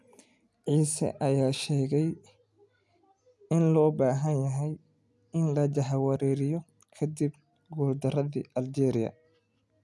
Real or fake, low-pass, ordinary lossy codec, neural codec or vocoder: real; none; none; none